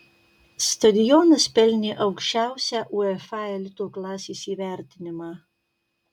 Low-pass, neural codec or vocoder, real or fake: 19.8 kHz; none; real